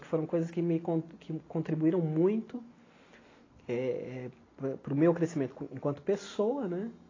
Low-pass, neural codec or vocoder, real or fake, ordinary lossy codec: 7.2 kHz; none; real; AAC, 32 kbps